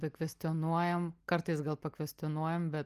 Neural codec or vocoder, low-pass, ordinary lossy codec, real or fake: none; 14.4 kHz; Opus, 32 kbps; real